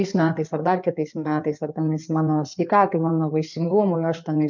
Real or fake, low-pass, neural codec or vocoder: fake; 7.2 kHz; codec, 16 kHz in and 24 kHz out, 2.2 kbps, FireRedTTS-2 codec